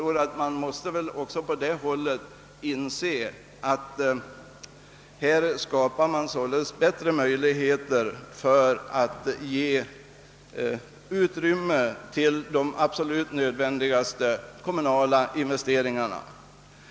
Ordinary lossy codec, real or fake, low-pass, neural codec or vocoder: none; real; none; none